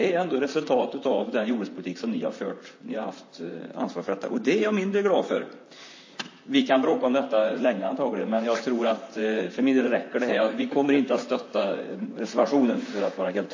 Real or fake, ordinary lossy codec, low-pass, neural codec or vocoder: fake; MP3, 32 kbps; 7.2 kHz; vocoder, 44.1 kHz, 128 mel bands, Pupu-Vocoder